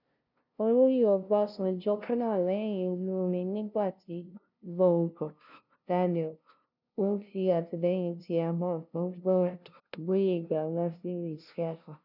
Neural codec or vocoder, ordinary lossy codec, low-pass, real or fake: codec, 16 kHz, 0.5 kbps, FunCodec, trained on LibriTTS, 25 frames a second; none; 5.4 kHz; fake